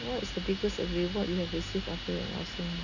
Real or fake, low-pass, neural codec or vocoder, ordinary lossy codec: real; 7.2 kHz; none; none